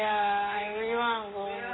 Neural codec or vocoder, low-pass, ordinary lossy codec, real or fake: none; 7.2 kHz; AAC, 16 kbps; real